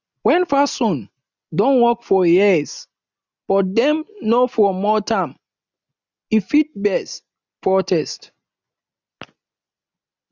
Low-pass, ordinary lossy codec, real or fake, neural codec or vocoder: 7.2 kHz; none; real; none